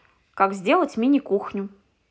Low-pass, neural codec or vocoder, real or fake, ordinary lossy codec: none; none; real; none